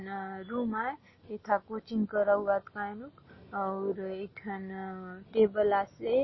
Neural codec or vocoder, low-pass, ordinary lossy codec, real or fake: none; 7.2 kHz; MP3, 24 kbps; real